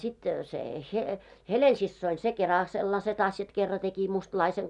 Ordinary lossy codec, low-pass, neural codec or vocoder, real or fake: none; 10.8 kHz; none; real